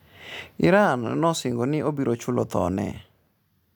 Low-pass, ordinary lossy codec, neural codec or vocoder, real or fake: none; none; none; real